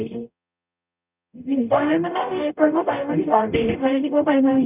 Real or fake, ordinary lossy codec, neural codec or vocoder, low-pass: fake; none; codec, 44.1 kHz, 0.9 kbps, DAC; 3.6 kHz